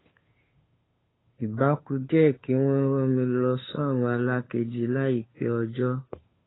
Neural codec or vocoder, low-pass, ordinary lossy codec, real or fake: codec, 16 kHz, 2 kbps, FunCodec, trained on Chinese and English, 25 frames a second; 7.2 kHz; AAC, 16 kbps; fake